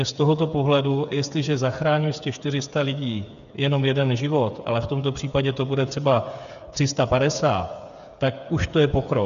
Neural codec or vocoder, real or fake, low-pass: codec, 16 kHz, 8 kbps, FreqCodec, smaller model; fake; 7.2 kHz